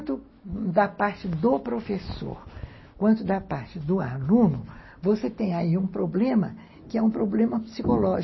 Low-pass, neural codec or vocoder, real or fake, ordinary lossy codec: 7.2 kHz; none; real; MP3, 24 kbps